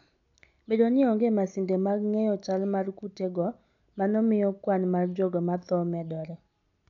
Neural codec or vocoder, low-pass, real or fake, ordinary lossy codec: none; 7.2 kHz; real; none